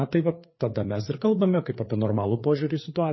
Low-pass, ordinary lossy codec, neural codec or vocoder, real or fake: 7.2 kHz; MP3, 24 kbps; codec, 16 kHz, 4 kbps, FreqCodec, larger model; fake